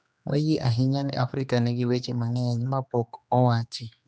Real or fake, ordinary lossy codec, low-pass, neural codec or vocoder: fake; none; none; codec, 16 kHz, 2 kbps, X-Codec, HuBERT features, trained on general audio